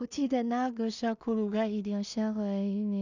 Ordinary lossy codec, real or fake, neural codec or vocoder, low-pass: none; fake; codec, 16 kHz in and 24 kHz out, 0.4 kbps, LongCat-Audio-Codec, two codebook decoder; 7.2 kHz